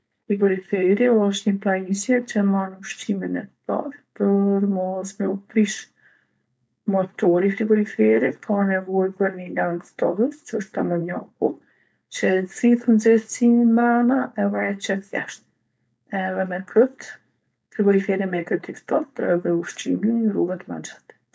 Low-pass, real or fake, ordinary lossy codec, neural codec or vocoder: none; fake; none; codec, 16 kHz, 4.8 kbps, FACodec